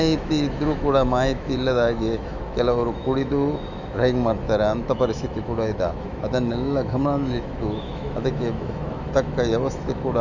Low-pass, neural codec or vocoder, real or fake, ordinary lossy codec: 7.2 kHz; autoencoder, 48 kHz, 128 numbers a frame, DAC-VAE, trained on Japanese speech; fake; none